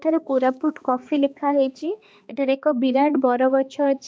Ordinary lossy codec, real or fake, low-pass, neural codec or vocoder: none; fake; none; codec, 16 kHz, 2 kbps, X-Codec, HuBERT features, trained on balanced general audio